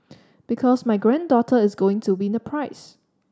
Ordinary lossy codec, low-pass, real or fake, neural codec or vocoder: none; none; real; none